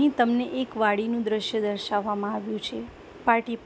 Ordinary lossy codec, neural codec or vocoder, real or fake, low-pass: none; none; real; none